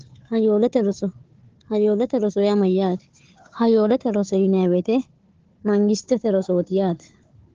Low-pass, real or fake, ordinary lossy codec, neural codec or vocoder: 7.2 kHz; fake; Opus, 16 kbps; codec, 16 kHz, 4 kbps, FunCodec, trained on Chinese and English, 50 frames a second